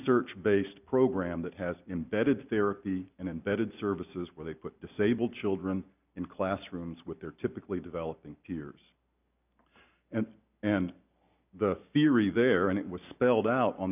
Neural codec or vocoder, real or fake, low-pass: none; real; 3.6 kHz